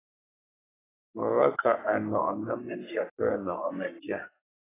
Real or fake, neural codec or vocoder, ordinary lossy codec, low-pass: fake; codec, 44.1 kHz, 3.4 kbps, Pupu-Codec; AAC, 16 kbps; 3.6 kHz